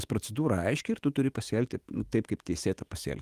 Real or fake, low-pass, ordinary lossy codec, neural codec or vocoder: fake; 14.4 kHz; Opus, 24 kbps; autoencoder, 48 kHz, 128 numbers a frame, DAC-VAE, trained on Japanese speech